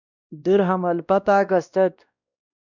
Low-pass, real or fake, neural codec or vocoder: 7.2 kHz; fake; codec, 16 kHz, 1 kbps, X-Codec, WavLM features, trained on Multilingual LibriSpeech